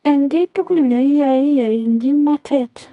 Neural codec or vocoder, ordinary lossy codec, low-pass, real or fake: codec, 24 kHz, 0.9 kbps, WavTokenizer, medium music audio release; none; 10.8 kHz; fake